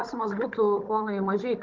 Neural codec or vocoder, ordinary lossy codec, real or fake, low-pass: codec, 16 kHz, 16 kbps, FunCodec, trained on Chinese and English, 50 frames a second; Opus, 24 kbps; fake; 7.2 kHz